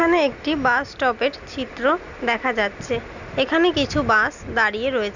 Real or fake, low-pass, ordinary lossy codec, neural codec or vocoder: real; 7.2 kHz; none; none